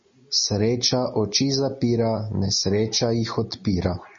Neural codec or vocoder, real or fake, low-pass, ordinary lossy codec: none; real; 7.2 kHz; MP3, 32 kbps